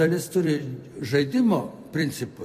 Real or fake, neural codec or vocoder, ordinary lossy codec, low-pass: fake; vocoder, 44.1 kHz, 128 mel bands every 256 samples, BigVGAN v2; MP3, 64 kbps; 14.4 kHz